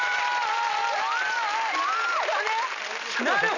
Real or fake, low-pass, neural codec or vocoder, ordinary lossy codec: real; 7.2 kHz; none; none